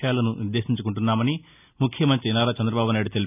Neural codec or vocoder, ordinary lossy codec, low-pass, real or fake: none; none; 3.6 kHz; real